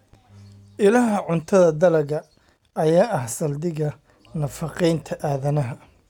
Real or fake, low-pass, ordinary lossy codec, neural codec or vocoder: real; 19.8 kHz; none; none